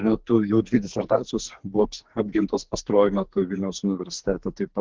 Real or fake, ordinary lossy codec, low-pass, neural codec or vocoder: fake; Opus, 16 kbps; 7.2 kHz; codec, 44.1 kHz, 2.6 kbps, SNAC